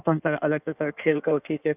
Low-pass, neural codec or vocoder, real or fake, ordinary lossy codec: 3.6 kHz; codec, 16 kHz in and 24 kHz out, 1.1 kbps, FireRedTTS-2 codec; fake; none